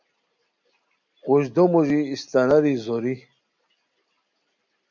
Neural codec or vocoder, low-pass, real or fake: none; 7.2 kHz; real